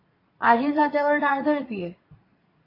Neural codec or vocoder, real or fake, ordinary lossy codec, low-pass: vocoder, 44.1 kHz, 80 mel bands, Vocos; fake; AAC, 24 kbps; 5.4 kHz